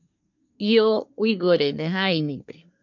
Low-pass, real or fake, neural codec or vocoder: 7.2 kHz; fake; codec, 24 kHz, 1 kbps, SNAC